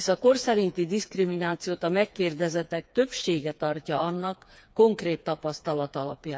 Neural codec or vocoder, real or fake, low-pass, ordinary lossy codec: codec, 16 kHz, 4 kbps, FreqCodec, smaller model; fake; none; none